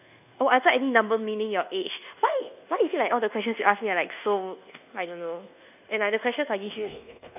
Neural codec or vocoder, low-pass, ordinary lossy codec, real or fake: codec, 24 kHz, 1.2 kbps, DualCodec; 3.6 kHz; none; fake